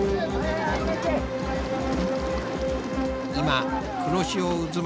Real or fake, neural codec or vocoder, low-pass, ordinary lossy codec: real; none; none; none